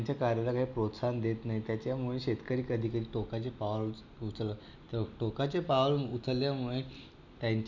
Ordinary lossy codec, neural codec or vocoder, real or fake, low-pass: none; none; real; 7.2 kHz